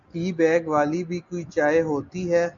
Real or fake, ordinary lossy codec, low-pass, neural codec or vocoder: real; MP3, 96 kbps; 7.2 kHz; none